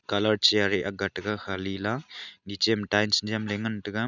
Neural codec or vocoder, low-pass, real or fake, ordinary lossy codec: none; 7.2 kHz; real; none